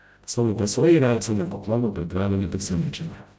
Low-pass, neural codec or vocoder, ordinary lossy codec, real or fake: none; codec, 16 kHz, 0.5 kbps, FreqCodec, smaller model; none; fake